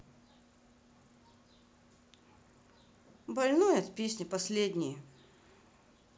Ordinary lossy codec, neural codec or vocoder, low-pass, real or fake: none; none; none; real